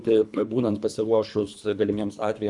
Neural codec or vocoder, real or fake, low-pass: codec, 24 kHz, 3 kbps, HILCodec; fake; 10.8 kHz